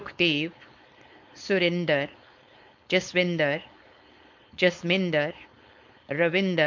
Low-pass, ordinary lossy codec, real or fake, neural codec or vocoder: 7.2 kHz; MP3, 48 kbps; fake; codec, 16 kHz, 4.8 kbps, FACodec